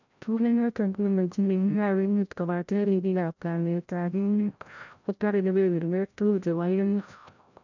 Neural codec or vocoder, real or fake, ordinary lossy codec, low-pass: codec, 16 kHz, 0.5 kbps, FreqCodec, larger model; fake; none; 7.2 kHz